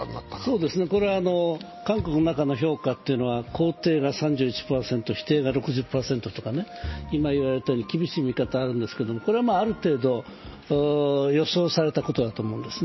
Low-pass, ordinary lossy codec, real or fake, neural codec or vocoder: 7.2 kHz; MP3, 24 kbps; real; none